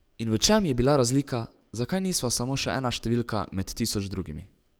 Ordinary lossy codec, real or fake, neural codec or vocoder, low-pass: none; fake; codec, 44.1 kHz, 7.8 kbps, DAC; none